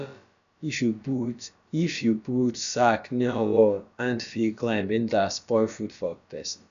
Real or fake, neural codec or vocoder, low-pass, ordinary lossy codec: fake; codec, 16 kHz, about 1 kbps, DyCAST, with the encoder's durations; 7.2 kHz; none